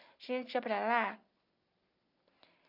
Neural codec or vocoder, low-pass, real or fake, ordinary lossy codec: none; 5.4 kHz; real; none